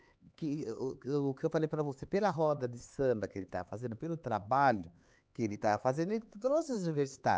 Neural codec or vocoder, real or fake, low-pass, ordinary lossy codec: codec, 16 kHz, 4 kbps, X-Codec, HuBERT features, trained on LibriSpeech; fake; none; none